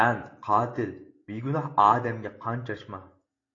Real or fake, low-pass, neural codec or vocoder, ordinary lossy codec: real; 7.2 kHz; none; AAC, 32 kbps